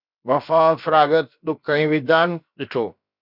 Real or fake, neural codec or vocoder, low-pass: fake; codec, 16 kHz, about 1 kbps, DyCAST, with the encoder's durations; 5.4 kHz